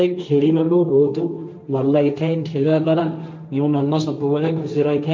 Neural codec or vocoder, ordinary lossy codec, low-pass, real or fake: codec, 16 kHz, 1.1 kbps, Voila-Tokenizer; none; none; fake